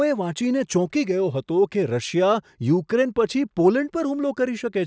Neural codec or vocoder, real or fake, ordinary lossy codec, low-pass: none; real; none; none